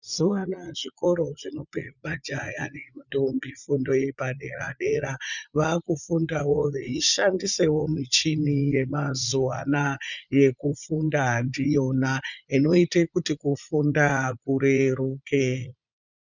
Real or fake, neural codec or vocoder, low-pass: fake; vocoder, 44.1 kHz, 80 mel bands, Vocos; 7.2 kHz